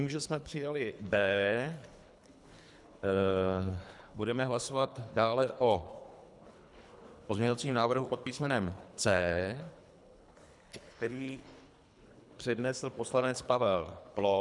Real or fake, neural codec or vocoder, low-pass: fake; codec, 24 kHz, 3 kbps, HILCodec; 10.8 kHz